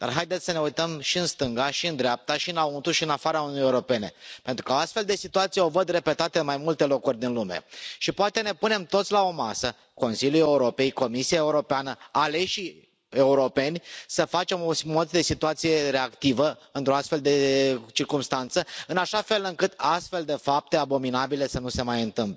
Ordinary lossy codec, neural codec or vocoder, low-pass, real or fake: none; none; none; real